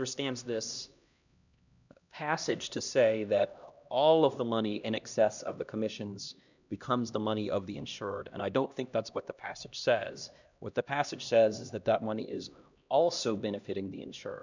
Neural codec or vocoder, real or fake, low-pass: codec, 16 kHz, 1 kbps, X-Codec, HuBERT features, trained on LibriSpeech; fake; 7.2 kHz